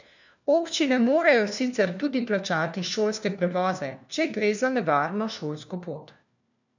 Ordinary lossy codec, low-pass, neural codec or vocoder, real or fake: none; 7.2 kHz; codec, 16 kHz, 1 kbps, FunCodec, trained on LibriTTS, 50 frames a second; fake